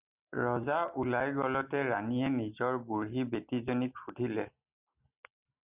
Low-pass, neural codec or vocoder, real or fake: 3.6 kHz; none; real